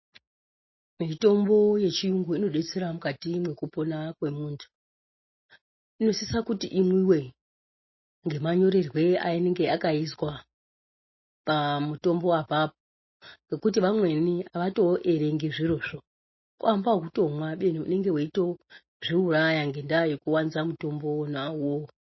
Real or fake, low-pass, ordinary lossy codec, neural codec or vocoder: real; 7.2 kHz; MP3, 24 kbps; none